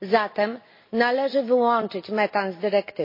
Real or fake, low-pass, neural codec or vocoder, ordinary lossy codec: real; 5.4 kHz; none; MP3, 24 kbps